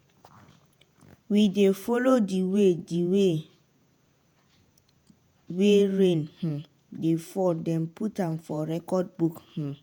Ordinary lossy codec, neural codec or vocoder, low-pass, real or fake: none; vocoder, 48 kHz, 128 mel bands, Vocos; none; fake